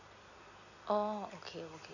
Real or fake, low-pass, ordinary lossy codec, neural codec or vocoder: real; 7.2 kHz; none; none